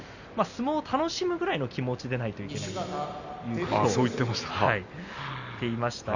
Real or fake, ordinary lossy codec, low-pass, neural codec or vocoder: real; none; 7.2 kHz; none